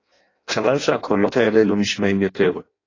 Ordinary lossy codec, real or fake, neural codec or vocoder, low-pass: AAC, 32 kbps; fake; codec, 16 kHz in and 24 kHz out, 0.6 kbps, FireRedTTS-2 codec; 7.2 kHz